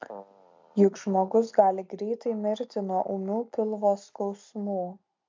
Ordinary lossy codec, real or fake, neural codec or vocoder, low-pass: MP3, 64 kbps; real; none; 7.2 kHz